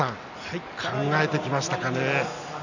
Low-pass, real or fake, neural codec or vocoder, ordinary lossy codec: 7.2 kHz; real; none; none